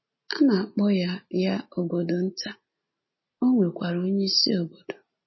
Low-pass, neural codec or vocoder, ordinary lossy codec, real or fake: 7.2 kHz; none; MP3, 24 kbps; real